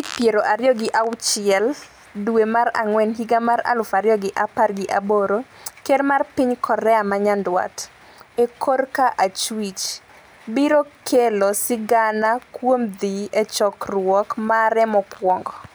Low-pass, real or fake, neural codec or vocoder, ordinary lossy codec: none; real; none; none